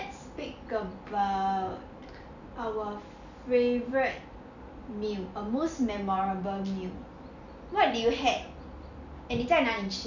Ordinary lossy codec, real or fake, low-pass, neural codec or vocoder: none; real; 7.2 kHz; none